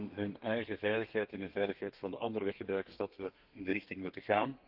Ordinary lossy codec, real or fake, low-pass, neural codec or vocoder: Opus, 32 kbps; fake; 5.4 kHz; codec, 32 kHz, 1.9 kbps, SNAC